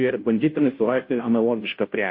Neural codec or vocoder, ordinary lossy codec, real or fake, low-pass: codec, 16 kHz, 0.5 kbps, FunCodec, trained on Chinese and English, 25 frames a second; MP3, 32 kbps; fake; 5.4 kHz